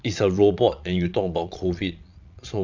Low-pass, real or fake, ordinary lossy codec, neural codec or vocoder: 7.2 kHz; fake; MP3, 64 kbps; vocoder, 22.05 kHz, 80 mel bands, Vocos